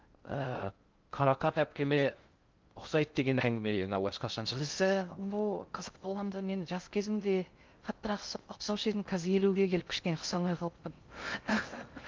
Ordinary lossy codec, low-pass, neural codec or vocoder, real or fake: Opus, 32 kbps; 7.2 kHz; codec, 16 kHz in and 24 kHz out, 0.6 kbps, FocalCodec, streaming, 2048 codes; fake